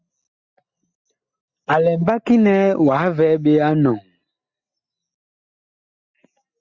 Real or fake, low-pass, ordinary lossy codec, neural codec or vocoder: real; 7.2 kHz; Opus, 64 kbps; none